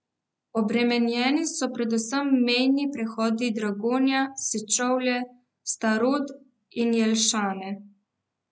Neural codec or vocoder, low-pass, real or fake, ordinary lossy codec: none; none; real; none